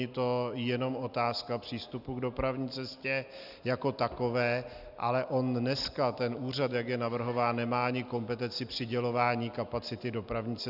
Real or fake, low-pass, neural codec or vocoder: real; 5.4 kHz; none